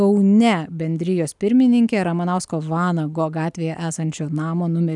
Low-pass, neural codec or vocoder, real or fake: 10.8 kHz; none; real